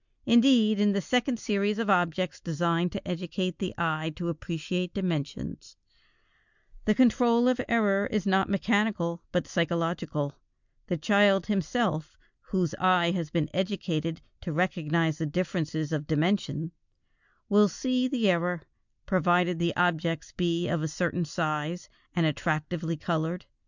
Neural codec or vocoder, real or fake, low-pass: none; real; 7.2 kHz